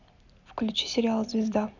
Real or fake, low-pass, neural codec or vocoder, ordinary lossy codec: real; 7.2 kHz; none; none